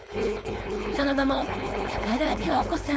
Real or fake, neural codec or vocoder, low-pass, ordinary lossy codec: fake; codec, 16 kHz, 4.8 kbps, FACodec; none; none